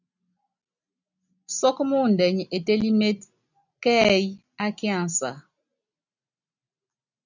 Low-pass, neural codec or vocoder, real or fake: 7.2 kHz; none; real